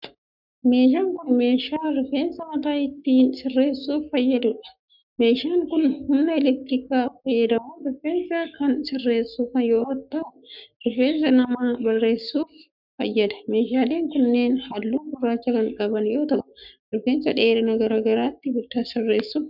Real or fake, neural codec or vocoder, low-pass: fake; codec, 44.1 kHz, 7.8 kbps, Pupu-Codec; 5.4 kHz